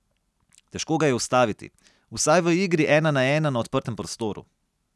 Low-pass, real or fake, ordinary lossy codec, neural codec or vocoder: none; real; none; none